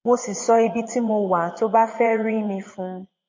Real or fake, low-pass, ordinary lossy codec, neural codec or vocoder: fake; 7.2 kHz; MP3, 32 kbps; vocoder, 22.05 kHz, 80 mel bands, Vocos